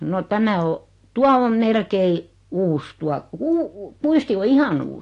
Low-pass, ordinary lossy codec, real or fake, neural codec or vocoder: 10.8 kHz; AAC, 48 kbps; real; none